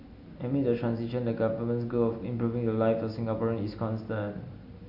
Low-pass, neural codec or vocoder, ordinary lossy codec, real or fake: 5.4 kHz; none; AAC, 48 kbps; real